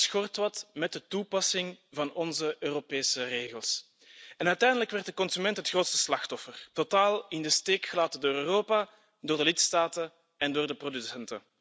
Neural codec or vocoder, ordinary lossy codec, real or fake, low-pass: none; none; real; none